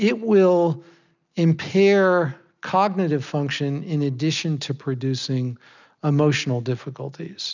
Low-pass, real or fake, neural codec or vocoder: 7.2 kHz; real; none